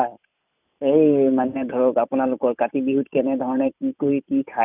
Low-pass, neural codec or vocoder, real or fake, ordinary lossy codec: 3.6 kHz; none; real; none